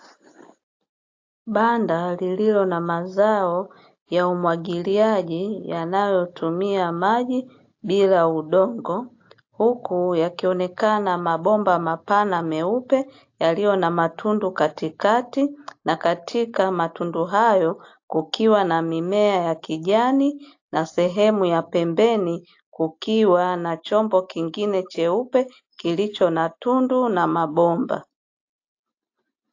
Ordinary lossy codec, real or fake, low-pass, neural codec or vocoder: AAC, 48 kbps; real; 7.2 kHz; none